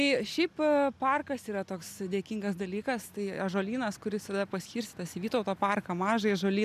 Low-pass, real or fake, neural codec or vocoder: 14.4 kHz; real; none